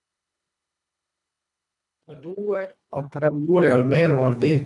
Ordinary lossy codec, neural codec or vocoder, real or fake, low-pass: none; codec, 24 kHz, 1.5 kbps, HILCodec; fake; 10.8 kHz